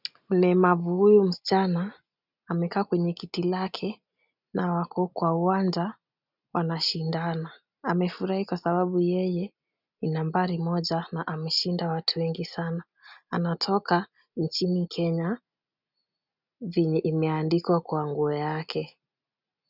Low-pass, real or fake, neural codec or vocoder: 5.4 kHz; real; none